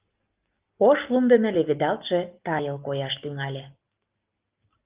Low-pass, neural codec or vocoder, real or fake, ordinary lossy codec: 3.6 kHz; none; real; Opus, 32 kbps